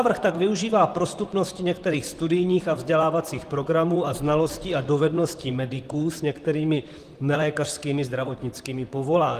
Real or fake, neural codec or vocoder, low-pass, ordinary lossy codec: fake; vocoder, 44.1 kHz, 128 mel bands, Pupu-Vocoder; 14.4 kHz; Opus, 24 kbps